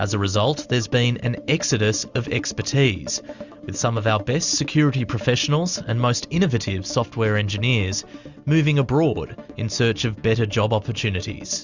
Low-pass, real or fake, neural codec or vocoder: 7.2 kHz; real; none